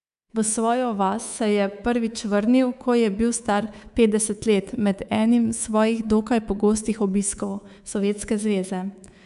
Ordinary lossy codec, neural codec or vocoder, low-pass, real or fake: none; codec, 24 kHz, 3.1 kbps, DualCodec; 10.8 kHz; fake